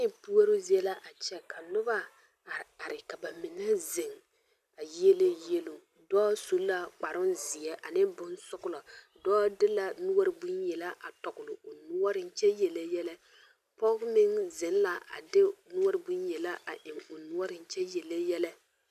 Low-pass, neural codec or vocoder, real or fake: 14.4 kHz; none; real